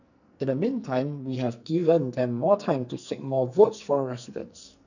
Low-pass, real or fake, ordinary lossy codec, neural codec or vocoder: 7.2 kHz; fake; none; codec, 44.1 kHz, 2.6 kbps, SNAC